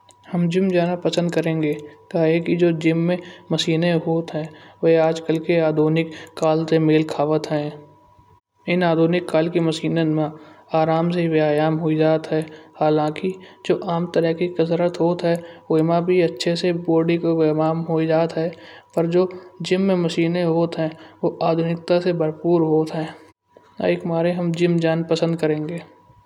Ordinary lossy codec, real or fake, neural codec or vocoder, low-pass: none; real; none; 19.8 kHz